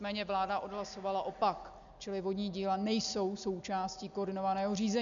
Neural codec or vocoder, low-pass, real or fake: none; 7.2 kHz; real